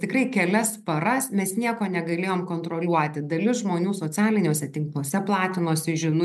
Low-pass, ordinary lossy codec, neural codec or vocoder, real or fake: 14.4 kHz; MP3, 96 kbps; none; real